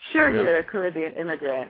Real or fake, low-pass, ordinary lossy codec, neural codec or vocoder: fake; 5.4 kHz; AAC, 24 kbps; codec, 24 kHz, 3 kbps, HILCodec